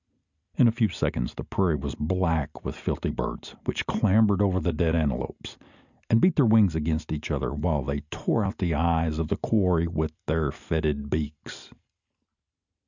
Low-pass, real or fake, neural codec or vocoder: 7.2 kHz; real; none